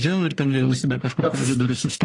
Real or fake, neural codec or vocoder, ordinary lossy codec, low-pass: fake; codec, 44.1 kHz, 1.7 kbps, Pupu-Codec; AAC, 48 kbps; 10.8 kHz